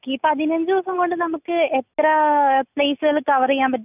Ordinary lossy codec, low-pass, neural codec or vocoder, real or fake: none; 3.6 kHz; none; real